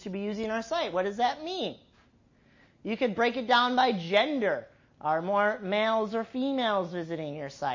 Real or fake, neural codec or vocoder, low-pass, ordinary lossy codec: real; none; 7.2 kHz; MP3, 32 kbps